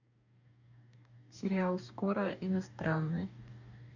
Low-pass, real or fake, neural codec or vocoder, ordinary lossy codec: 7.2 kHz; fake; codec, 44.1 kHz, 2.6 kbps, DAC; none